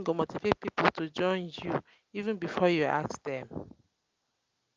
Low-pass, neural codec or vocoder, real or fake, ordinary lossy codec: 7.2 kHz; none; real; Opus, 24 kbps